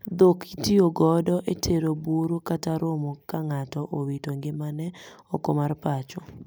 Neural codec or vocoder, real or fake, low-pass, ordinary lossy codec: none; real; none; none